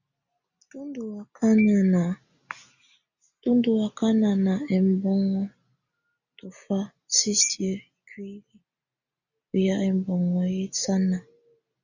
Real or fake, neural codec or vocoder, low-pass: real; none; 7.2 kHz